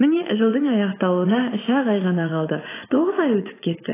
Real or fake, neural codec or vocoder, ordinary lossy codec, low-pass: fake; vocoder, 44.1 kHz, 80 mel bands, Vocos; AAC, 16 kbps; 3.6 kHz